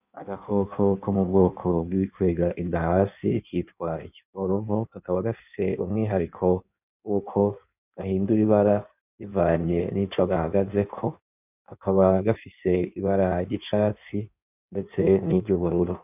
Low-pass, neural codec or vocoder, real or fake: 3.6 kHz; codec, 16 kHz in and 24 kHz out, 1.1 kbps, FireRedTTS-2 codec; fake